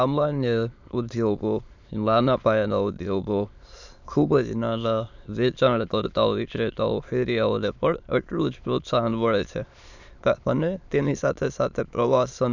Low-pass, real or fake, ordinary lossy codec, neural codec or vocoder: 7.2 kHz; fake; none; autoencoder, 22.05 kHz, a latent of 192 numbers a frame, VITS, trained on many speakers